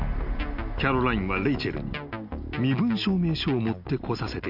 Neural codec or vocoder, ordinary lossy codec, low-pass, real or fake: none; none; 5.4 kHz; real